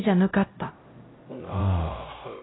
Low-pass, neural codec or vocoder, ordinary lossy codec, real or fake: 7.2 kHz; codec, 16 kHz, 0.5 kbps, X-Codec, HuBERT features, trained on LibriSpeech; AAC, 16 kbps; fake